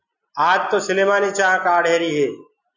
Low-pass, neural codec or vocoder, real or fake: 7.2 kHz; none; real